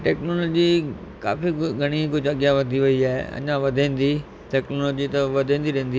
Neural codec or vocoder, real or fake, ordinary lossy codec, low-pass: none; real; none; none